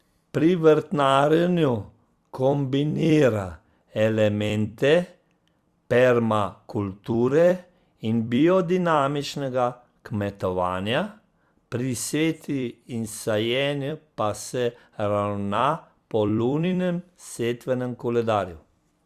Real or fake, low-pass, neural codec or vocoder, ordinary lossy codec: fake; 14.4 kHz; vocoder, 44.1 kHz, 128 mel bands every 256 samples, BigVGAN v2; Opus, 64 kbps